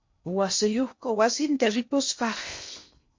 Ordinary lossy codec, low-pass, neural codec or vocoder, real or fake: MP3, 48 kbps; 7.2 kHz; codec, 16 kHz in and 24 kHz out, 0.6 kbps, FocalCodec, streaming, 2048 codes; fake